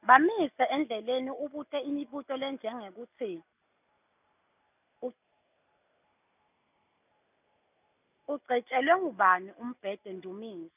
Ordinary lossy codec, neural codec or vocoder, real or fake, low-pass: none; none; real; 3.6 kHz